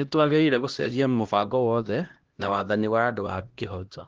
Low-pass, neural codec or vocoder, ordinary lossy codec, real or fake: 7.2 kHz; codec, 16 kHz, 1 kbps, X-Codec, HuBERT features, trained on LibriSpeech; Opus, 16 kbps; fake